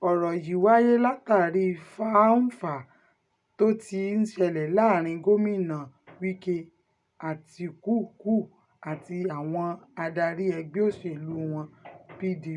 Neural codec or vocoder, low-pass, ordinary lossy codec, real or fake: none; 10.8 kHz; none; real